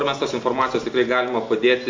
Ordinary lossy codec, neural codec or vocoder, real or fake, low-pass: MP3, 48 kbps; none; real; 7.2 kHz